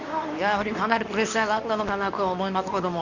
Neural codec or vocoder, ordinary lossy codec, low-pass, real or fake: codec, 24 kHz, 0.9 kbps, WavTokenizer, medium speech release version 2; none; 7.2 kHz; fake